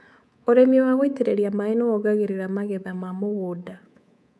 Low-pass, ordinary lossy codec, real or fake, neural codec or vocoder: none; none; fake; codec, 24 kHz, 3.1 kbps, DualCodec